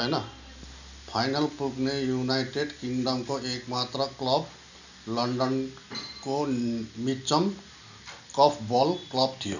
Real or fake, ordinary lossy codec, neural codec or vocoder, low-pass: real; none; none; 7.2 kHz